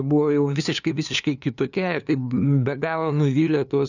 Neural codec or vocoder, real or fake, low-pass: codec, 16 kHz, 2 kbps, FunCodec, trained on LibriTTS, 25 frames a second; fake; 7.2 kHz